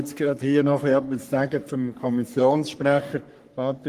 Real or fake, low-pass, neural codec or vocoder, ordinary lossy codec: fake; 14.4 kHz; codec, 44.1 kHz, 3.4 kbps, Pupu-Codec; Opus, 24 kbps